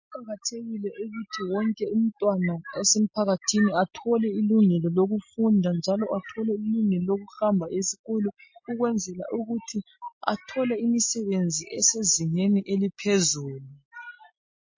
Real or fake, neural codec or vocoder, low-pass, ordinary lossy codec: real; none; 7.2 kHz; MP3, 32 kbps